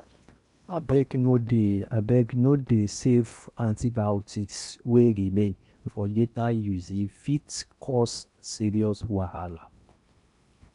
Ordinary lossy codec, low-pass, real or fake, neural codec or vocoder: none; 10.8 kHz; fake; codec, 16 kHz in and 24 kHz out, 0.8 kbps, FocalCodec, streaming, 65536 codes